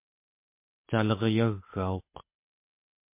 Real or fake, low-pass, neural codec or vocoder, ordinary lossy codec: fake; 3.6 kHz; codec, 24 kHz, 6 kbps, HILCodec; MP3, 24 kbps